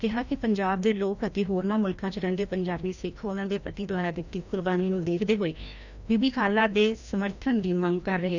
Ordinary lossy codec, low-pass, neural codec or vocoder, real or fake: none; 7.2 kHz; codec, 16 kHz, 1 kbps, FreqCodec, larger model; fake